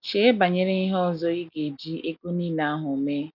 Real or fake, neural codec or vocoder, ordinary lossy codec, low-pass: real; none; none; 5.4 kHz